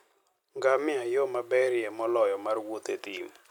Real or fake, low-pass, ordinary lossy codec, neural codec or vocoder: real; 19.8 kHz; none; none